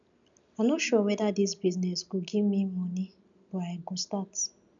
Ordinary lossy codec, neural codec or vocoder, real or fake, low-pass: none; none; real; 7.2 kHz